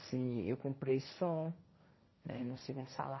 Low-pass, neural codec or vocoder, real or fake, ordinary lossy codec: 7.2 kHz; codec, 16 kHz, 1.1 kbps, Voila-Tokenizer; fake; MP3, 24 kbps